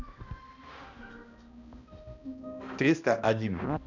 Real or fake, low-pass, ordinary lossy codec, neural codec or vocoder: fake; 7.2 kHz; none; codec, 16 kHz, 1 kbps, X-Codec, HuBERT features, trained on balanced general audio